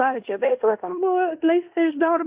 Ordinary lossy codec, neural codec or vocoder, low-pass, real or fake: Opus, 64 kbps; codec, 16 kHz in and 24 kHz out, 0.9 kbps, LongCat-Audio-Codec, fine tuned four codebook decoder; 3.6 kHz; fake